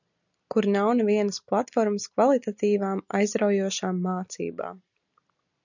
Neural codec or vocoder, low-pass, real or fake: none; 7.2 kHz; real